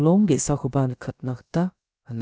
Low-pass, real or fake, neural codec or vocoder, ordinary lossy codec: none; fake; codec, 16 kHz, about 1 kbps, DyCAST, with the encoder's durations; none